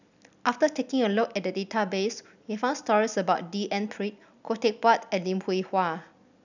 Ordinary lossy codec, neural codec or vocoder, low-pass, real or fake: none; none; 7.2 kHz; real